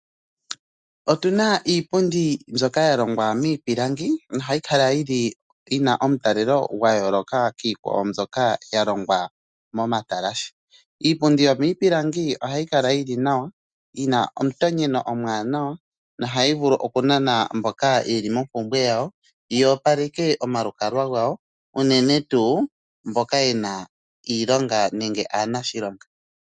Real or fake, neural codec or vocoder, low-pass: real; none; 9.9 kHz